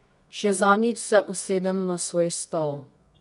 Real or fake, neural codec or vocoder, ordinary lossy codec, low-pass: fake; codec, 24 kHz, 0.9 kbps, WavTokenizer, medium music audio release; none; 10.8 kHz